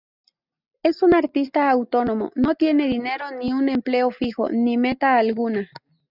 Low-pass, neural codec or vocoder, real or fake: 5.4 kHz; none; real